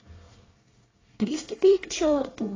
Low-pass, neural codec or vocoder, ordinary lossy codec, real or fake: 7.2 kHz; codec, 24 kHz, 1 kbps, SNAC; AAC, 32 kbps; fake